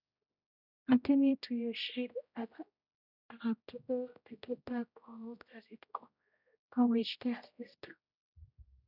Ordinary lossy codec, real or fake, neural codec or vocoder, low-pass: none; fake; codec, 16 kHz, 0.5 kbps, X-Codec, HuBERT features, trained on general audio; 5.4 kHz